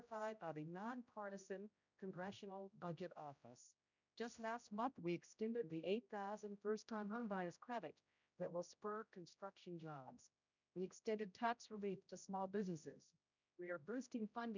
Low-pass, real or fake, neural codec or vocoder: 7.2 kHz; fake; codec, 16 kHz, 0.5 kbps, X-Codec, HuBERT features, trained on general audio